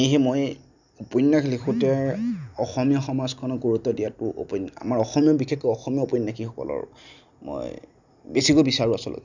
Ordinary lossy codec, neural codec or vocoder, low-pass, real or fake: none; none; 7.2 kHz; real